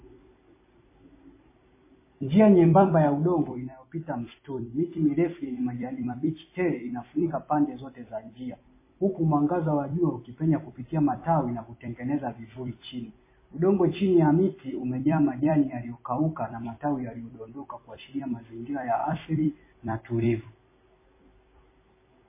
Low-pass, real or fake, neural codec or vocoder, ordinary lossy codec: 3.6 kHz; fake; vocoder, 44.1 kHz, 128 mel bands every 256 samples, BigVGAN v2; MP3, 16 kbps